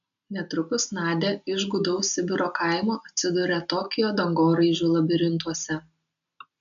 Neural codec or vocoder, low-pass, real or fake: none; 7.2 kHz; real